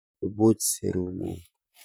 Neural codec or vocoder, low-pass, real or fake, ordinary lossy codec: vocoder, 44.1 kHz, 128 mel bands, Pupu-Vocoder; none; fake; none